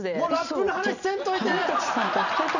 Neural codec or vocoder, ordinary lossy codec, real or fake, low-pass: none; none; real; 7.2 kHz